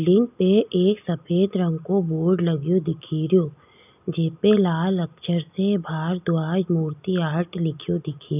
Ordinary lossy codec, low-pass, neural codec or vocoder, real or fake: none; 3.6 kHz; none; real